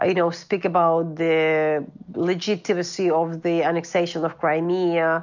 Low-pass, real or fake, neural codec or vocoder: 7.2 kHz; real; none